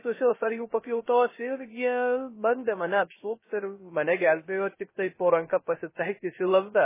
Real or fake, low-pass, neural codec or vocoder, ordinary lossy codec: fake; 3.6 kHz; codec, 16 kHz, 0.3 kbps, FocalCodec; MP3, 16 kbps